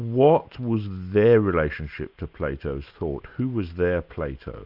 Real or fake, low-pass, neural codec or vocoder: real; 5.4 kHz; none